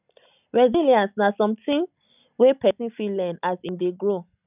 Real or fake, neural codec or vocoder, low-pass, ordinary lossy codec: real; none; 3.6 kHz; none